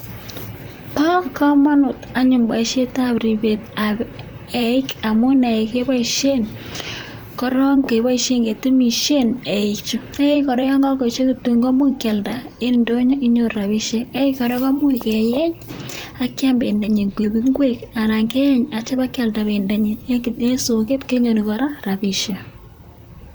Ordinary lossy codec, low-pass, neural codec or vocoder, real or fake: none; none; vocoder, 44.1 kHz, 128 mel bands, Pupu-Vocoder; fake